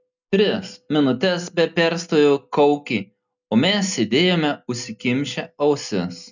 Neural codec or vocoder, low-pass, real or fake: none; 7.2 kHz; real